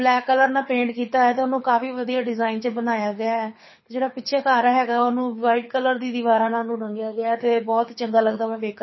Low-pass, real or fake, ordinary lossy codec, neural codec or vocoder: 7.2 kHz; fake; MP3, 24 kbps; codec, 24 kHz, 6 kbps, HILCodec